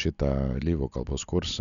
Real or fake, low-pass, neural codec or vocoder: real; 7.2 kHz; none